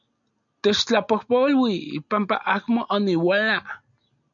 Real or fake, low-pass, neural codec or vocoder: real; 7.2 kHz; none